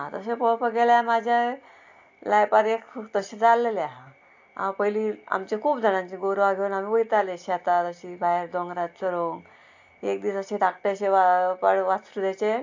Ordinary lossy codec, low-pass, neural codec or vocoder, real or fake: AAC, 48 kbps; 7.2 kHz; none; real